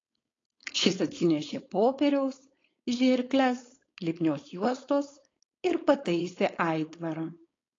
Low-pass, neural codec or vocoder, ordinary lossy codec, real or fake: 7.2 kHz; codec, 16 kHz, 4.8 kbps, FACodec; AAC, 32 kbps; fake